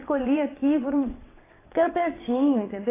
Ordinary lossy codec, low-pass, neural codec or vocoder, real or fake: AAC, 16 kbps; 3.6 kHz; vocoder, 22.05 kHz, 80 mel bands, WaveNeXt; fake